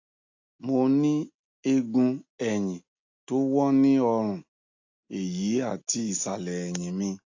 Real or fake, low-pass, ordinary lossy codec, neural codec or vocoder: real; 7.2 kHz; AAC, 48 kbps; none